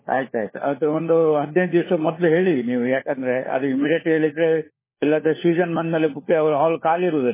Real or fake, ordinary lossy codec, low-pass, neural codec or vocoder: fake; MP3, 16 kbps; 3.6 kHz; codec, 16 kHz, 4 kbps, FunCodec, trained on LibriTTS, 50 frames a second